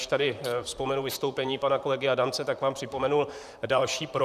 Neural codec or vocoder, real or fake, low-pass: vocoder, 44.1 kHz, 128 mel bands, Pupu-Vocoder; fake; 14.4 kHz